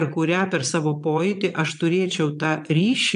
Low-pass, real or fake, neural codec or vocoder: 9.9 kHz; fake; vocoder, 22.05 kHz, 80 mel bands, Vocos